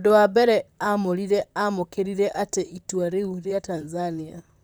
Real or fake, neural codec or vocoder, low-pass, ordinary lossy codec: fake; vocoder, 44.1 kHz, 128 mel bands, Pupu-Vocoder; none; none